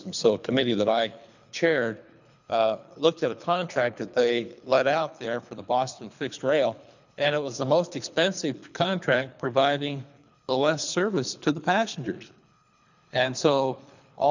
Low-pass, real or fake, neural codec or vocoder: 7.2 kHz; fake; codec, 24 kHz, 3 kbps, HILCodec